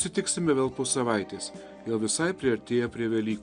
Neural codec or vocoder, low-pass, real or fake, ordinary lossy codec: none; 9.9 kHz; real; Opus, 64 kbps